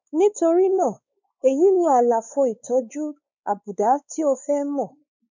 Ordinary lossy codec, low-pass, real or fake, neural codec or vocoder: none; 7.2 kHz; fake; codec, 16 kHz, 4 kbps, X-Codec, WavLM features, trained on Multilingual LibriSpeech